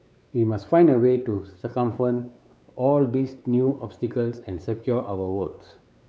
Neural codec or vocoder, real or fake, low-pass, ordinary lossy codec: codec, 16 kHz, 4 kbps, X-Codec, WavLM features, trained on Multilingual LibriSpeech; fake; none; none